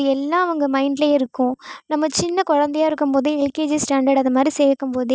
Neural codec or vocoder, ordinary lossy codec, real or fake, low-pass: none; none; real; none